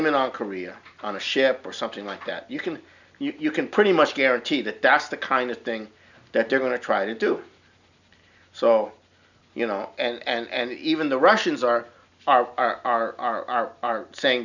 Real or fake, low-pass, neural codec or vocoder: real; 7.2 kHz; none